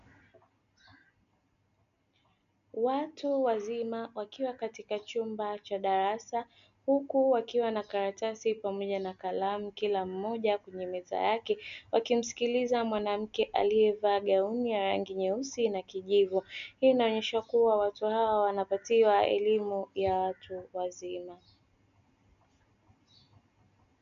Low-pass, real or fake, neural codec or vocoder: 7.2 kHz; real; none